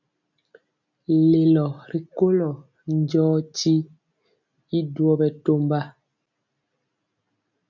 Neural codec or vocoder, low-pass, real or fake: none; 7.2 kHz; real